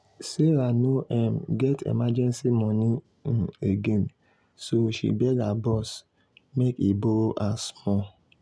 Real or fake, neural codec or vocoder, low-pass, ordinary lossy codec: real; none; none; none